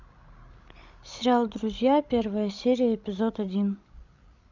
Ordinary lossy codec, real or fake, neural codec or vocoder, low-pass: MP3, 64 kbps; fake; codec, 16 kHz, 16 kbps, FreqCodec, larger model; 7.2 kHz